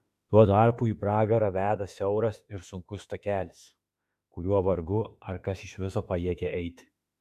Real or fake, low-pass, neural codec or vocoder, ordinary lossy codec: fake; 14.4 kHz; autoencoder, 48 kHz, 32 numbers a frame, DAC-VAE, trained on Japanese speech; AAC, 96 kbps